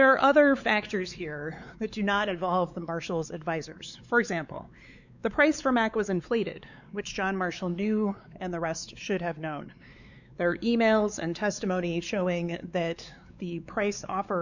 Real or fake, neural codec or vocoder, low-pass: fake; codec, 16 kHz, 4 kbps, X-Codec, WavLM features, trained on Multilingual LibriSpeech; 7.2 kHz